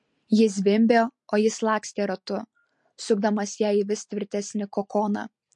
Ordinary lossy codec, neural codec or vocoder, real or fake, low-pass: MP3, 48 kbps; none; real; 10.8 kHz